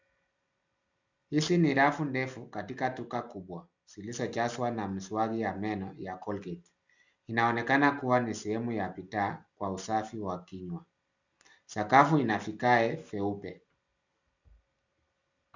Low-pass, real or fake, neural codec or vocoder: 7.2 kHz; real; none